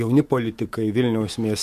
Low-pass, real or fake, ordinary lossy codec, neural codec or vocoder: 14.4 kHz; fake; MP3, 64 kbps; autoencoder, 48 kHz, 128 numbers a frame, DAC-VAE, trained on Japanese speech